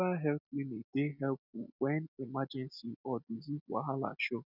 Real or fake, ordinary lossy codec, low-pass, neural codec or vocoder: real; none; 5.4 kHz; none